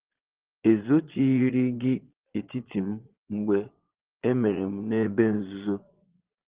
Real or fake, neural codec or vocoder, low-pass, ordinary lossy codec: fake; vocoder, 22.05 kHz, 80 mel bands, Vocos; 3.6 kHz; Opus, 16 kbps